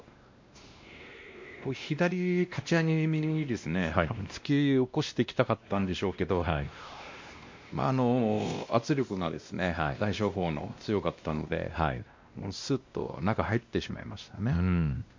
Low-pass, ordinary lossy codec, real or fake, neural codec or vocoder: 7.2 kHz; MP3, 48 kbps; fake; codec, 16 kHz, 1 kbps, X-Codec, WavLM features, trained on Multilingual LibriSpeech